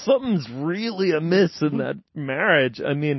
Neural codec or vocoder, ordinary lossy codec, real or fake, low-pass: none; MP3, 24 kbps; real; 7.2 kHz